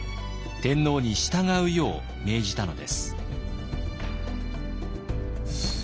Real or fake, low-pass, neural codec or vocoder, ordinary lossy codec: real; none; none; none